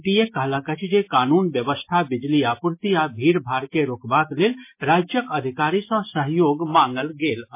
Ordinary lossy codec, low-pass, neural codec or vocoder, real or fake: MP3, 24 kbps; 3.6 kHz; none; real